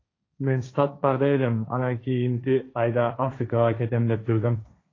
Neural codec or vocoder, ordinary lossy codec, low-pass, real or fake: codec, 16 kHz, 1.1 kbps, Voila-Tokenizer; AAC, 32 kbps; 7.2 kHz; fake